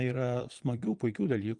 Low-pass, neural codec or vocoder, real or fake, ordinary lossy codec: 9.9 kHz; vocoder, 22.05 kHz, 80 mel bands, Vocos; fake; Opus, 24 kbps